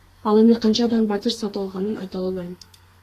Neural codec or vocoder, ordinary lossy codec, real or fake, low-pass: codec, 32 kHz, 1.9 kbps, SNAC; AAC, 48 kbps; fake; 14.4 kHz